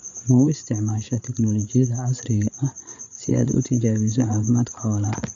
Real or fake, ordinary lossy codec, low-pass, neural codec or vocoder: fake; none; 7.2 kHz; codec, 16 kHz, 8 kbps, FreqCodec, larger model